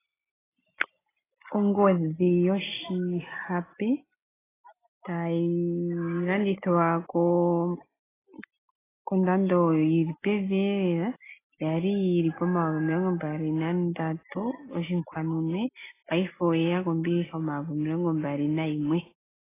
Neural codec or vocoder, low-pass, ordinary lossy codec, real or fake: none; 3.6 kHz; AAC, 16 kbps; real